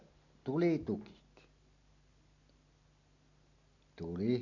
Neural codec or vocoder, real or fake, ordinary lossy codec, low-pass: none; real; none; 7.2 kHz